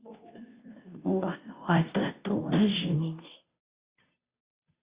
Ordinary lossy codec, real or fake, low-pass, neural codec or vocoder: Opus, 64 kbps; fake; 3.6 kHz; codec, 16 kHz, 0.5 kbps, FunCodec, trained on Chinese and English, 25 frames a second